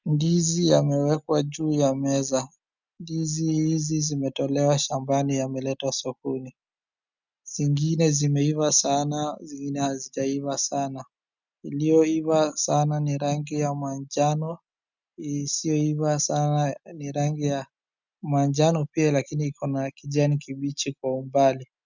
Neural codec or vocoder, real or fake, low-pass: none; real; 7.2 kHz